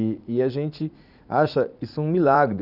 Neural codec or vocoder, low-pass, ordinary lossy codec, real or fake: vocoder, 44.1 kHz, 80 mel bands, Vocos; 5.4 kHz; none; fake